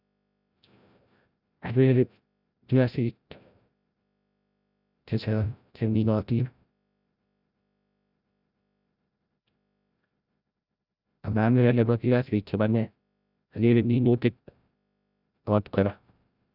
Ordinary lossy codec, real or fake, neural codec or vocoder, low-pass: none; fake; codec, 16 kHz, 0.5 kbps, FreqCodec, larger model; 5.4 kHz